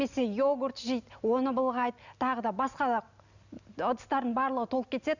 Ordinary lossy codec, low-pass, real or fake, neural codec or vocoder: none; 7.2 kHz; real; none